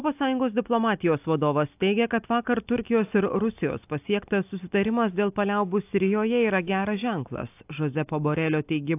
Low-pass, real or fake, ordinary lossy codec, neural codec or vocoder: 3.6 kHz; real; AAC, 32 kbps; none